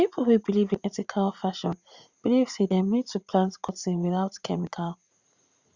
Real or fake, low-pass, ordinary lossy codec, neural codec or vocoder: fake; 7.2 kHz; Opus, 64 kbps; vocoder, 44.1 kHz, 80 mel bands, Vocos